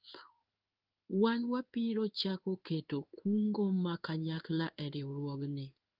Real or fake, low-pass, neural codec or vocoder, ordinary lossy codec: fake; 5.4 kHz; codec, 16 kHz in and 24 kHz out, 1 kbps, XY-Tokenizer; Opus, 32 kbps